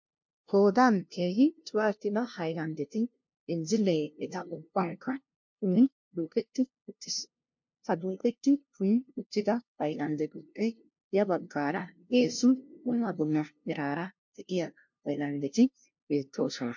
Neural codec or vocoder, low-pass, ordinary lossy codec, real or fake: codec, 16 kHz, 0.5 kbps, FunCodec, trained on LibriTTS, 25 frames a second; 7.2 kHz; MP3, 48 kbps; fake